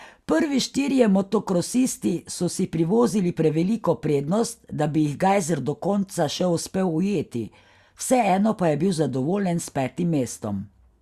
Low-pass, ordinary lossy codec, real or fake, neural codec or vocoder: 14.4 kHz; Opus, 64 kbps; real; none